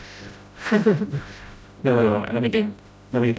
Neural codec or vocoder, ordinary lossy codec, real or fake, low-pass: codec, 16 kHz, 0.5 kbps, FreqCodec, smaller model; none; fake; none